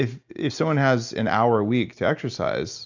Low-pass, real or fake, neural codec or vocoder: 7.2 kHz; real; none